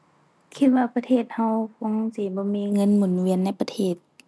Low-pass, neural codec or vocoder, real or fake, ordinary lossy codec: none; none; real; none